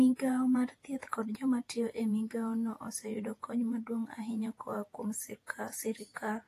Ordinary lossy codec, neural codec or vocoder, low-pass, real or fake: AAC, 48 kbps; vocoder, 48 kHz, 128 mel bands, Vocos; 14.4 kHz; fake